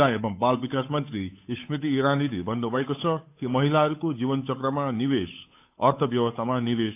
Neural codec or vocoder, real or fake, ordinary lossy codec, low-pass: codec, 16 kHz, 8 kbps, FunCodec, trained on Chinese and English, 25 frames a second; fake; AAC, 32 kbps; 3.6 kHz